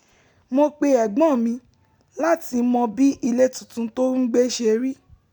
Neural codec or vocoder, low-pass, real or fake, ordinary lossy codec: none; 19.8 kHz; real; none